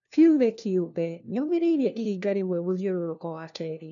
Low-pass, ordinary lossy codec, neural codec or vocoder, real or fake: 7.2 kHz; MP3, 96 kbps; codec, 16 kHz, 1 kbps, FunCodec, trained on LibriTTS, 50 frames a second; fake